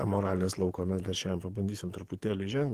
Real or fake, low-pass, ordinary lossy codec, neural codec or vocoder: fake; 14.4 kHz; Opus, 16 kbps; codec, 44.1 kHz, 7.8 kbps, DAC